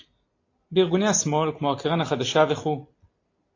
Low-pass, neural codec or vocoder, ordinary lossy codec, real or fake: 7.2 kHz; none; AAC, 32 kbps; real